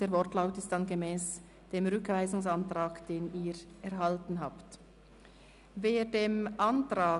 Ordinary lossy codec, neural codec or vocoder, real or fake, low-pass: none; none; real; 10.8 kHz